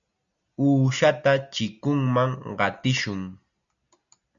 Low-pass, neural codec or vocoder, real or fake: 7.2 kHz; none; real